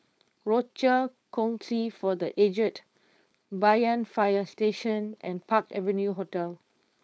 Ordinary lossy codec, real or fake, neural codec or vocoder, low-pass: none; fake; codec, 16 kHz, 4.8 kbps, FACodec; none